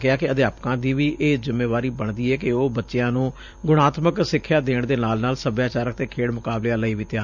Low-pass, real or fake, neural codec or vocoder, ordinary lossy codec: 7.2 kHz; fake; vocoder, 44.1 kHz, 128 mel bands every 512 samples, BigVGAN v2; none